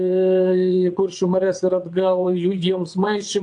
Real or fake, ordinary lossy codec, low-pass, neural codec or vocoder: fake; AAC, 64 kbps; 9.9 kHz; vocoder, 22.05 kHz, 80 mel bands, WaveNeXt